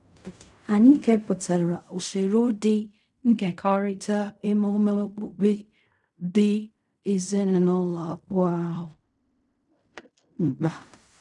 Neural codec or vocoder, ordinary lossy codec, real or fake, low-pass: codec, 16 kHz in and 24 kHz out, 0.4 kbps, LongCat-Audio-Codec, fine tuned four codebook decoder; none; fake; 10.8 kHz